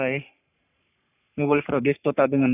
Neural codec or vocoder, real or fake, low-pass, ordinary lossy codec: codec, 44.1 kHz, 3.4 kbps, Pupu-Codec; fake; 3.6 kHz; none